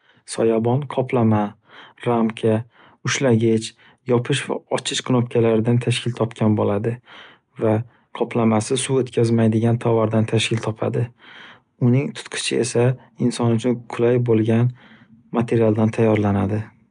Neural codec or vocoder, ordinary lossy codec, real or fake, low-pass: none; none; real; 9.9 kHz